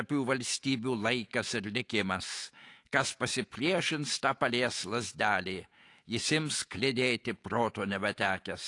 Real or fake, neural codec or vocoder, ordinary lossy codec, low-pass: fake; autoencoder, 48 kHz, 128 numbers a frame, DAC-VAE, trained on Japanese speech; AAC, 48 kbps; 10.8 kHz